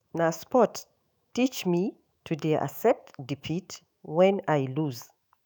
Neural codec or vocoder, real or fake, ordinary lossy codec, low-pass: autoencoder, 48 kHz, 128 numbers a frame, DAC-VAE, trained on Japanese speech; fake; none; none